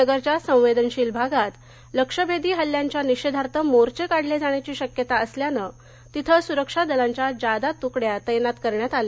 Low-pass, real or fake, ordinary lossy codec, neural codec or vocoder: none; real; none; none